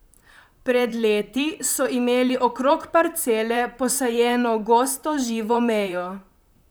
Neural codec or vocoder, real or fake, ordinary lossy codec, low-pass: vocoder, 44.1 kHz, 128 mel bands, Pupu-Vocoder; fake; none; none